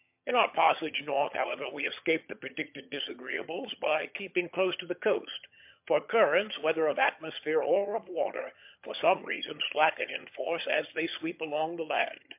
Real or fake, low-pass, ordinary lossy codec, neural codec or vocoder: fake; 3.6 kHz; MP3, 32 kbps; vocoder, 22.05 kHz, 80 mel bands, HiFi-GAN